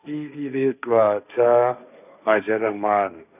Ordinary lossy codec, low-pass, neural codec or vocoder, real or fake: none; 3.6 kHz; codec, 16 kHz, 1.1 kbps, Voila-Tokenizer; fake